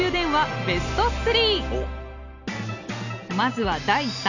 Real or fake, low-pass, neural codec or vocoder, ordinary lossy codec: real; 7.2 kHz; none; none